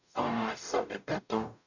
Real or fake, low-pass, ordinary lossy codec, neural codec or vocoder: fake; 7.2 kHz; none; codec, 44.1 kHz, 0.9 kbps, DAC